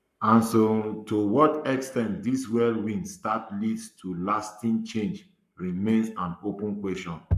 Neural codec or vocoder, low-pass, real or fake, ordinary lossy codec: codec, 44.1 kHz, 7.8 kbps, Pupu-Codec; 14.4 kHz; fake; none